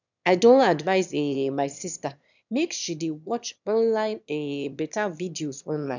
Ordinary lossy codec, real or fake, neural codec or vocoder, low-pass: none; fake; autoencoder, 22.05 kHz, a latent of 192 numbers a frame, VITS, trained on one speaker; 7.2 kHz